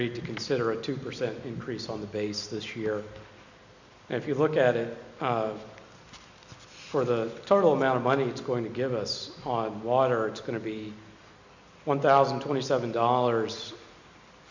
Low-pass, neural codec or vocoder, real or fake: 7.2 kHz; none; real